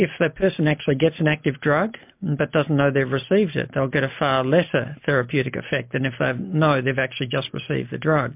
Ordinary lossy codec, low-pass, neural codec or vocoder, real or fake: MP3, 32 kbps; 3.6 kHz; none; real